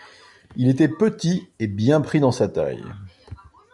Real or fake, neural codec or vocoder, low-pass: real; none; 10.8 kHz